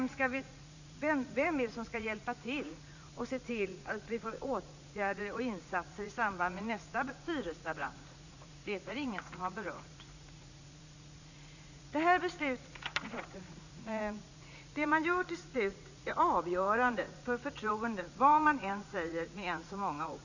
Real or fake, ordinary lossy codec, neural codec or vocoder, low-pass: fake; none; vocoder, 44.1 kHz, 80 mel bands, Vocos; 7.2 kHz